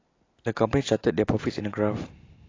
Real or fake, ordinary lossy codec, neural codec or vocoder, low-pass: real; AAC, 32 kbps; none; 7.2 kHz